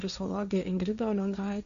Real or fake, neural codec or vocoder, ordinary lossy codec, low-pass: fake; codec, 16 kHz, 1.1 kbps, Voila-Tokenizer; AAC, 96 kbps; 7.2 kHz